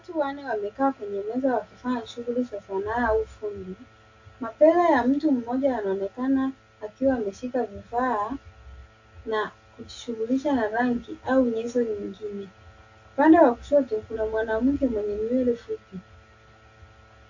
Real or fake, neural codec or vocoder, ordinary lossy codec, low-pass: real; none; AAC, 48 kbps; 7.2 kHz